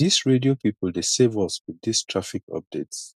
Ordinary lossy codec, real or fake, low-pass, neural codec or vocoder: none; real; 14.4 kHz; none